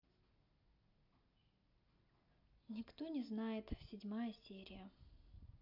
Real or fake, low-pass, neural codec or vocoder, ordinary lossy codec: real; 5.4 kHz; none; MP3, 48 kbps